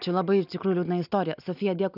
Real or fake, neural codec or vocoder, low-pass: real; none; 5.4 kHz